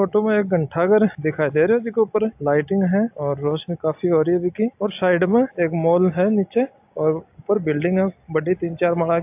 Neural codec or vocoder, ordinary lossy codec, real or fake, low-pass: none; AAC, 32 kbps; real; 3.6 kHz